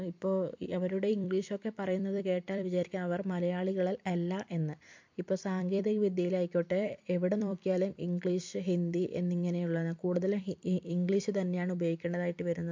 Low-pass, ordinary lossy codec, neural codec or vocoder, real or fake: 7.2 kHz; MP3, 48 kbps; vocoder, 44.1 kHz, 128 mel bands every 256 samples, BigVGAN v2; fake